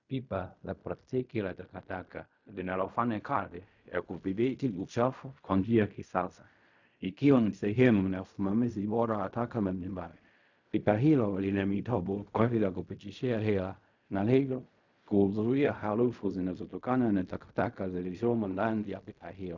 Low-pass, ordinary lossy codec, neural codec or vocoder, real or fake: 7.2 kHz; Opus, 64 kbps; codec, 16 kHz in and 24 kHz out, 0.4 kbps, LongCat-Audio-Codec, fine tuned four codebook decoder; fake